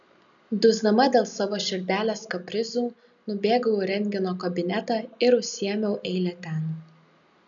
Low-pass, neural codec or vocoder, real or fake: 7.2 kHz; none; real